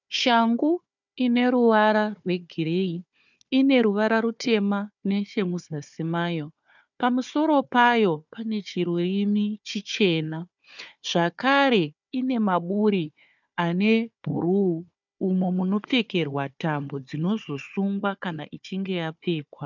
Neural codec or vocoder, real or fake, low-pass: codec, 16 kHz, 4 kbps, FunCodec, trained on Chinese and English, 50 frames a second; fake; 7.2 kHz